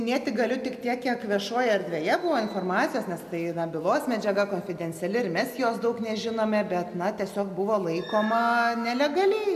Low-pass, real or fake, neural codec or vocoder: 14.4 kHz; real; none